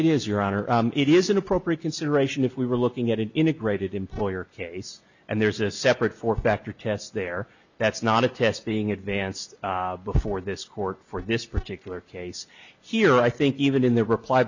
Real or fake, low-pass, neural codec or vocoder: real; 7.2 kHz; none